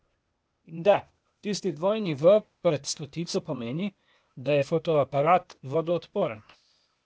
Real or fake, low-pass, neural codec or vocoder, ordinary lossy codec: fake; none; codec, 16 kHz, 0.8 kbps, ZipCodec; none